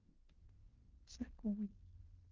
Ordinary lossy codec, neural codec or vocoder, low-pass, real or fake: Opus, 16 kbps; codec, 16 kHz in and 24 kHz out, 0.9 kbps, LongCat-Audio-Codec, fine tuned four codebook decoder; 7.2 kHz; fake